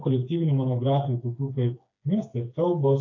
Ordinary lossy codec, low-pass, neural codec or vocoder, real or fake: AAC, 48 kbps; 7.2 kHz; codec, 16 kHz, 4 kbps, FreqCodec, smaller model; fake